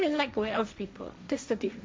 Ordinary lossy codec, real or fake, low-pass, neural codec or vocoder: none; fake; none; codec, 16 kHz, 1.1 kbps, Voila-Tokenizer